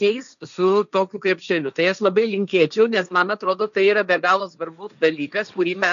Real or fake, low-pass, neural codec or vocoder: fake; 7.2 kHz; codec, 16 kHz, 1.1 kbps, Voila-Tokenizer